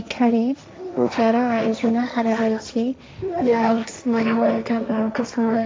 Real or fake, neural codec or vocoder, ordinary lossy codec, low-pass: fake; codec, 16 kHz, 1.1 kbps, Voila-Tokenizer; none; none